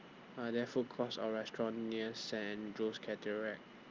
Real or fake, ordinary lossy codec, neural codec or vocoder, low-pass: real; Opus, 24 kbps; none; 7.2 kHz